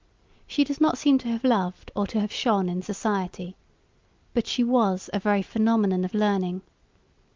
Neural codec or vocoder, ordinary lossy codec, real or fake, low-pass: none; Opus, 24 kbps; real; 7.2 kHz